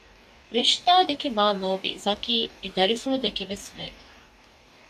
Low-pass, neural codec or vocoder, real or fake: 14.4 kHz; codec, 44.1 kHz, 2.6 kbps, DAC; fake